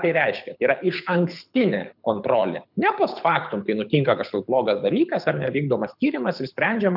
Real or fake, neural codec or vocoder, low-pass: fake; codec, 24 kHz, 6 kbps, HILCodec; 5.4 kHz